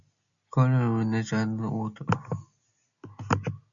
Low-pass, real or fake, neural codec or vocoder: 7.2 kHz; real; none